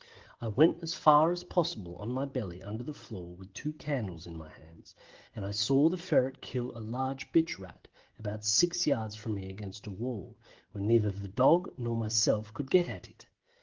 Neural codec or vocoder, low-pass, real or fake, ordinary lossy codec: codec, 16 kHz, 16 kbps, FreqCodec, smaller model; 7.2 kHz; fake; Opus, 16 kbps